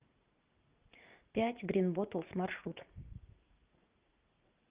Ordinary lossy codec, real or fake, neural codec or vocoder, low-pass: Opus, 32 kbps; fake; vocoder, 44.1 kHz, 80 mel bands, Vocos; 3.6 kHz